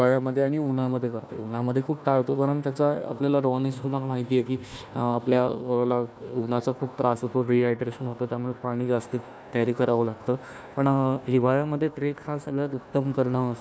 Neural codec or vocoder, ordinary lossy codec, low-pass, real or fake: codec, 16 kHz, 1 kbps, FunCodec, trained on Chinese and English, 50 frames a second; none; none; fake